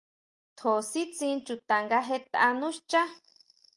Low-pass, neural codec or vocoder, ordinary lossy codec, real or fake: 10.8 kHz; none; Opus, 32 kbps; real